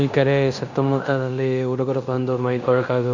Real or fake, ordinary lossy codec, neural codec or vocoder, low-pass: fake; none; codec, 16 kHz in and 24 kHz out, 0.9 kbps, LongCat-Audio-Codec, fine tuned four codebook decoder; 7.2 kHz